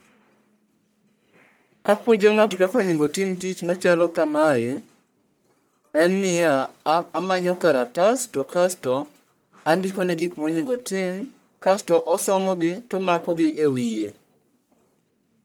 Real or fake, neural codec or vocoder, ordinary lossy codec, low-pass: fake; codec, 44.1 kHz, 1.7 kbps, Pupu-Codec; none; none